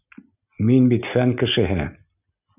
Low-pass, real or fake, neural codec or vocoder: 3.6 kHz; real; none